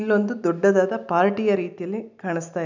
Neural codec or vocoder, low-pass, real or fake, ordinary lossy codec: none; 7.2 kHz; real; none